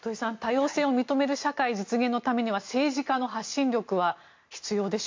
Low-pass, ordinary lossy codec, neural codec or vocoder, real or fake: 7.2 kHz; MP3, 48 kbps; none; real